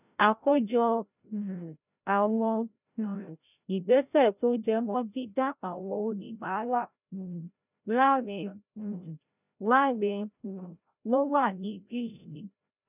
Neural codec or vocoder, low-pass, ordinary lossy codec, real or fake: codec, 16 kHz, 0.5 kbps, FreqCodec, larger model; 3.6 kHz; none; fake